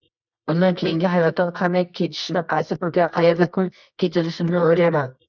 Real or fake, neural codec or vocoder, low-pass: fake; codec, 24 kHz, 0.9 kbps, WavTokenizer, medium music audio release; 7.2 kHz